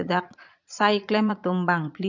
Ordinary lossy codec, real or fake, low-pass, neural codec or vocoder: none; fake; 7.2 kHz; vocoder, 22.05 kHz, 80 mel bands, Vocos